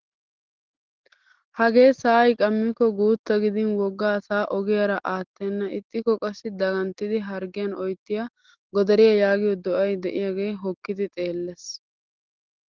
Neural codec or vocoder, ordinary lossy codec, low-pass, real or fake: none; Opus, 16 kbps; 7.2 kHz; real